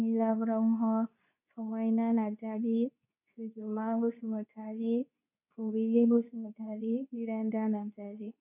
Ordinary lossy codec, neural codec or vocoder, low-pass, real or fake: none; codec, 24 kHz, 0.9 kbps, WavTokenizer, medium speech release version 2; 3.6 kHz; fake